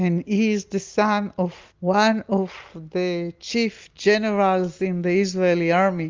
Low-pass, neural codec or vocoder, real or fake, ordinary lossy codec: 7.2 kHz; none; real; Opus, 32 kbps